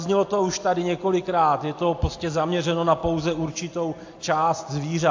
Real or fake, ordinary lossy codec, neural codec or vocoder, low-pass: real; AAC, 48 kbps; none; 7.2 kHz